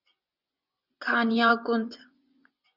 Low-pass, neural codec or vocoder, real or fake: 5.4 kHz; vocoder, 24 kHz, 100 mel bands, Vocos; fake